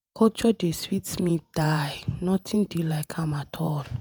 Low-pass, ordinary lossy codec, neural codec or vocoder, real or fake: none; none; vocoder, 48 kHz, 128 mel bands, Vocos; fake